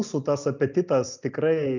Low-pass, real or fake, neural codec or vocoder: 7.2 kHz; real; none